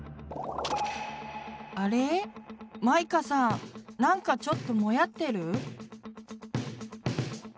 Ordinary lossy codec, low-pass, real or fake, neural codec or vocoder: none; none; real; none